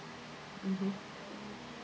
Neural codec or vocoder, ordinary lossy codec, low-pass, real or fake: none; none; none; real